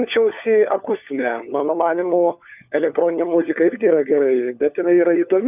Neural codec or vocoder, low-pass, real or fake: codec, 16 kHz, 4 kbps, FunCodec, trained on LibriTTS, 50 frames a second; 3.6 kHz; fake